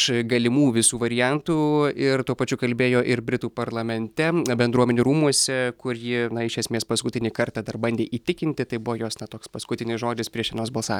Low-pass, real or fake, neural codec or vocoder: 19.8 kHz; real; none